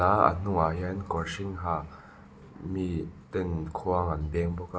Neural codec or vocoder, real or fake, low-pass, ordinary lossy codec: none; real; none; none